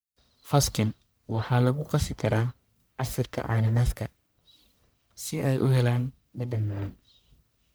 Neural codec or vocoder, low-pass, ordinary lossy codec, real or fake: codec, 44.1 kHz, 1.7 kbps, Pupu-Codec; none; none; fake